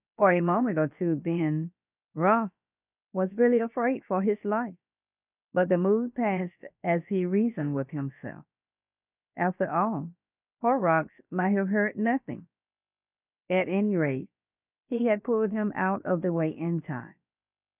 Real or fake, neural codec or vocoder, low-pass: fake; codec, 16 kHz, about 1 kbps, DyCAST, with the encoder's durations; 3.6 kHz